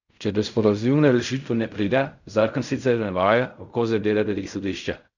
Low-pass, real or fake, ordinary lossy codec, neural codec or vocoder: 7.2 kHz; fake; none; codec, 16 kHz in and 24 kHz out, 0.4 kbps, LongCat-Audio-Codec, fine tuned four codebook decoder